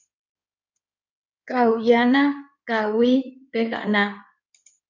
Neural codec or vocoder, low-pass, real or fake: codec, 16 kHz in and 24 kHz out, 2.2 kbps, FireRedTTS-2 codec; 7.2 kHz; fake